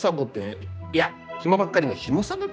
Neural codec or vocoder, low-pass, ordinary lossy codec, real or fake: codec, 16 kHz, 2 kbps, X-Codec, HuBERT features, trained on general audio; none; none; fake